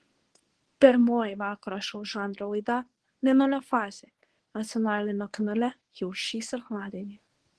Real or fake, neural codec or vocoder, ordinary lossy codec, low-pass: fake; codec, 24 kHz, 0.9 kbps, WavTokenizer, medium speech release version 1; Opus, 16 kbps; 10.8 kHz